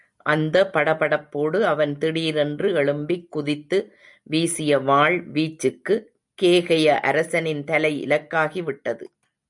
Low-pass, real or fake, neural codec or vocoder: 10.8 kHz; real; none